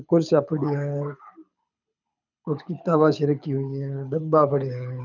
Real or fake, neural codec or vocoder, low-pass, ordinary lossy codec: fake; codec, 24 kHz, 6 kbps, HILCodec; 7.2 kHz; none